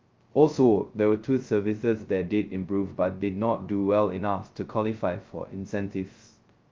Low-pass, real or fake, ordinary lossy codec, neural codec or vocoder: 7.2 kHz; fake; Opus, 32 kbps; codec, 16 kHz, 0.2 kbps, FocalCodec